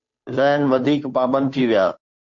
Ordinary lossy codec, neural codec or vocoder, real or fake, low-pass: MP3, 48 kbps; codec, 16 kHz, 2 kbps, FunCodec, trained on Chinese and English, 25 frames a second; fake; 7.2 kHz